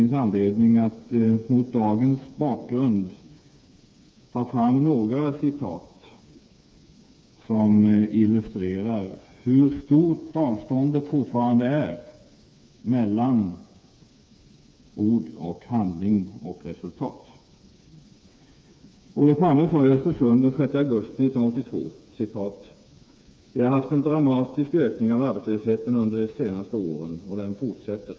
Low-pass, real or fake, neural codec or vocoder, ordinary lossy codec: none; fake; codec, 16 kHz, 4 kbps, FreqCodec, smaller model; none